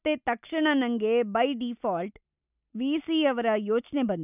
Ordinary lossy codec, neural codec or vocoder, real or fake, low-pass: none; none; real; 3.6 kHz